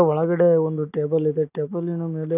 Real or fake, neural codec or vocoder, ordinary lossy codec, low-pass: real; none; none; 3.6 kHz